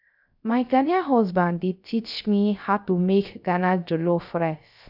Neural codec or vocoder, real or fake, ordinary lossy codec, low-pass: codec, 16 kHz, 0.3 kbps, FocalCodec; fake; none; 5.4 kHz